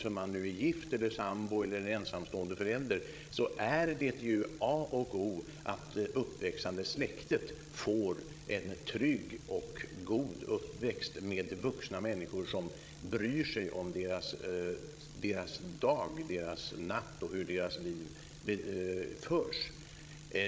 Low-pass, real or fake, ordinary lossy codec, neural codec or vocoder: none; fake; none; codec, 16 kHz, 16 kbps, FreqCodec, larger model